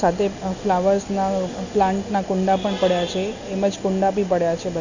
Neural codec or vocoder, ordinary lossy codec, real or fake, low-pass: none; none; real; 7.2 kHz